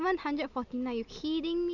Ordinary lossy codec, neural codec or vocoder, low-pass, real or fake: none; none; 7.2 kHz; real